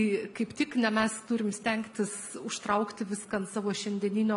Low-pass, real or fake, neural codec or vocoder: 10.8 kHz; real; none